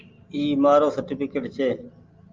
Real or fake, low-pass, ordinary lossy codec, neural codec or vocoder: real; 7.2 kHz; Opus, 24 kbps; none